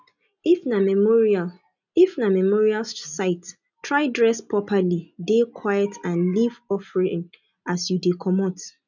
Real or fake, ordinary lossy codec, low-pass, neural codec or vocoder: real; none; 7.2 kHz; none